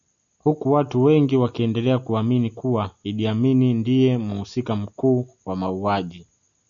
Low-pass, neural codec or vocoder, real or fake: 7.2 kHz; none; real